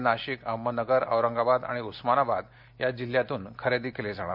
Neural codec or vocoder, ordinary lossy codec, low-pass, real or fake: none; none; 5.4 kHz; real